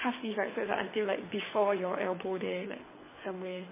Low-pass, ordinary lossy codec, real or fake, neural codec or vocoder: 3.6 kHz; MP3, 16 kbps; fake; codec, 24 kHz, 6 kbps, HILCodec